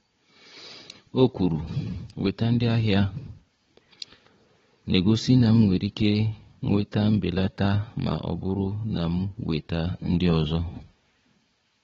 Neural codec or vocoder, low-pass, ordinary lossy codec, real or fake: codec, 16 kHz, 4 kbps, FunCodec, trained on Chinese and English, 50 frames a second; 7.2 kHz; AAC, 24 kbps; fake